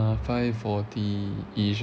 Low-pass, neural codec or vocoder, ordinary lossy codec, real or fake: none; none; none; real